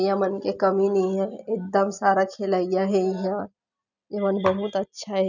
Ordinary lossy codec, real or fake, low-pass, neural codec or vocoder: none; real; 7.2 kHz; none